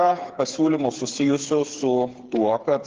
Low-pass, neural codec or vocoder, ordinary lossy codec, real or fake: 7.2 kHz; codec, 16 kHz, 4 kbps, FreqCodec, smaller model; Opus, 16 kbps; fake